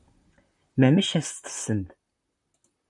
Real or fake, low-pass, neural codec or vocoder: fake; 10.8 kHz; vocoder, 44.1 kHz, 128 mel bands, Pupu-Vocoder